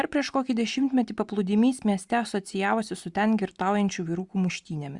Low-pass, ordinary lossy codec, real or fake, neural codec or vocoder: 10.8 kHz; Opus, 64 kbps; real; none